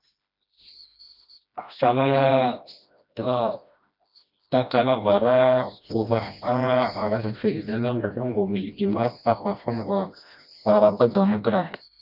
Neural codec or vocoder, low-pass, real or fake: codec, 16 kHz, 1 kbps, FreqCodec, smaller model; 5.4 kHz; fake